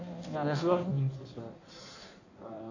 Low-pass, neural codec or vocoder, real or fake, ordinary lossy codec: 7.2 kHz; codec, 16 kHz in and 24 kHz out, 1.1 kbps, FireRedTTS-2 codec; fake; none